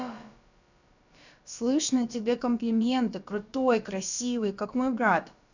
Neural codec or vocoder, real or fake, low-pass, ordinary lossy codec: codec, 16 kHz, about 1 kbps, DyCAST, with the encoder's durations; fake; 7.2 kHz; none